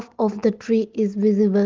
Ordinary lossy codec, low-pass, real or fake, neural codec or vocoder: Opus, 16 kbps; 7.2 kHz; real; none